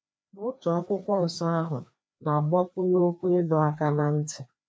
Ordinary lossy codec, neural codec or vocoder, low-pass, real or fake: none; codec, 16 kHz, 2 kbps, FreqCodec, larger model; none; fake